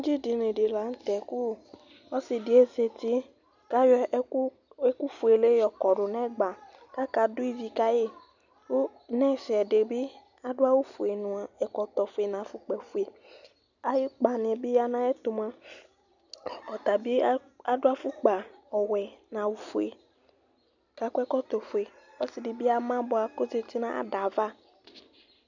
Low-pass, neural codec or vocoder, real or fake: 7.2 kHz; none; real